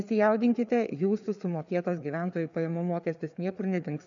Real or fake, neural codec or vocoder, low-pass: fake; codec, 16 kHz, 4 kbps, FreqCodec, larger model; 7.2 kHz